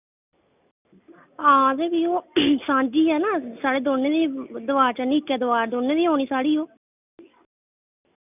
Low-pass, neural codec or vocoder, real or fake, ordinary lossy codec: 3.6 kHz; none; real; none